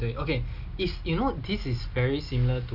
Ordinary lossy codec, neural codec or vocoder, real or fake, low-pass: Opus, 64 kbps; none; real; 5.4 kHz